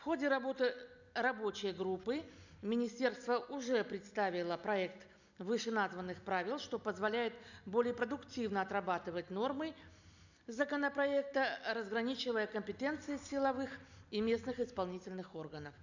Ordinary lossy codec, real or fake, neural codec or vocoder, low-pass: none; real; none; 7.2 kHz